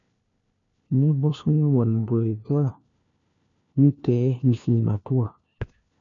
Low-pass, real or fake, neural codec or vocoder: 7.2 kHz; fake; codec, 16 kHz, 1 kbps, FunCodec, trained on LibriTTS, 50 frames a second